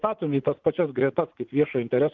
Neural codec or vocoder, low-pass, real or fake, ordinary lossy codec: vocoder, 44.1 kHz, 80 mel bands, Vocos; 7.2 kHz; fake; Opus, 32 kbps